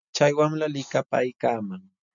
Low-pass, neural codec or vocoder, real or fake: 7.2 kHz; none; real